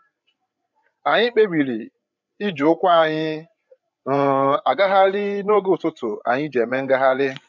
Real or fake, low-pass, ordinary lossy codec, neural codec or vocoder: fake; 7.2 kHz; none; codec, 16 kHz, 8 kbps, FreqCodec, larger model